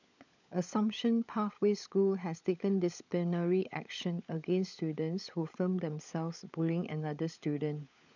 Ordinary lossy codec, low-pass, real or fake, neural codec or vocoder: none; 7.2 kHz; fake; codec, 16 kHz, 16 kbps, FunCodec, trained on LibriTTS, 50 frames a second